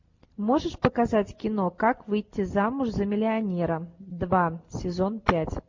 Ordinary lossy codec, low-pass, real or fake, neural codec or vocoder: MP3, 48 kbps; 7.2 kHz; real; none